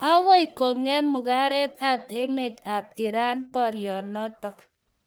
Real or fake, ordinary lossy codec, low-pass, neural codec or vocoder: fake; none; none; codec, 44.1 kHz, 1.7 kbps, Pupu-Codec